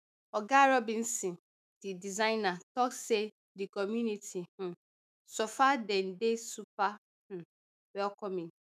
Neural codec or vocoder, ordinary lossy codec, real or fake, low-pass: autoencoder, 48 kHz, 128 numbers a frame, DAC-VAE, trained on Japanese speech; none; fake; 14.4 kHz